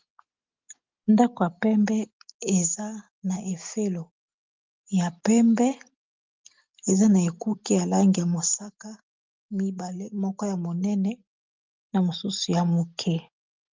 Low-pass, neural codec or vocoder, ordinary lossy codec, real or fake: 7.2 kHz; none; Opus, 32 kbps; real